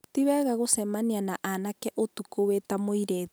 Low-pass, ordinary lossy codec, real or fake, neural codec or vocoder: none; none; real; none